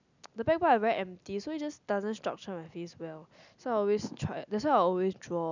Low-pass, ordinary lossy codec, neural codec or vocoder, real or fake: 7.2 kHz; none; none; real